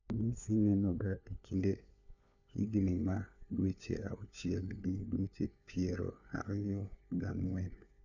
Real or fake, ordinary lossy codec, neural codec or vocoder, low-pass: fake; none; codec, 16 kHz, 4 kbps, FunCodec, trained on LibriTTS, 50 frames a second; 7.2 kHz